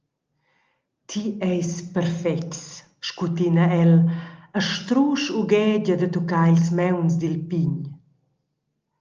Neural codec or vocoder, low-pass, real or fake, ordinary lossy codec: none; 7.2 kHz; real; Opus, 24 kbps